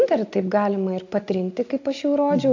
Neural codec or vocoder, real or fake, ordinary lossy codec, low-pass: none; real; AAC, 48 kbps; 7.2 kHz